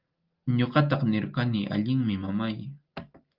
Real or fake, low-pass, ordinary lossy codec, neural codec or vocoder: real; 5.4 kHz; Opus, 32 kbps; none